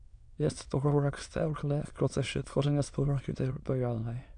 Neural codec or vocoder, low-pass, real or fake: autoencoder, 22.05 kHz, a latent of 192 numbers a frame, VITS, trained on many speakers; 9.9 kHz; fake